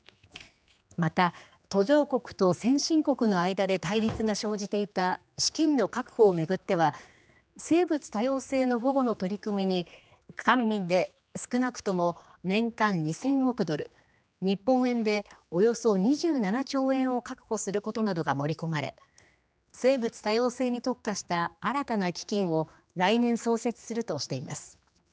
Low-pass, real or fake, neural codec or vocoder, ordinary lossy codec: none; fake; codec, 16 kHz, 2 kbps, X-Codec, HuBERT features, trained on general audio; none